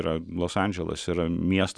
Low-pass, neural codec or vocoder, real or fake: 9.9 kHz; none; real